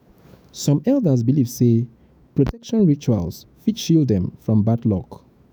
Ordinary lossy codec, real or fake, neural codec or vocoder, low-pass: none; fake; autoencoder, 48 kHz, 128 numbers a frame, DAC-VAE, trained on Japanese speech; none